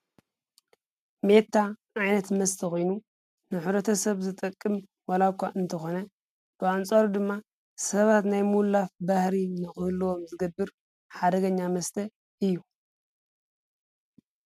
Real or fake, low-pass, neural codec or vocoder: real; 14.4 kHz; none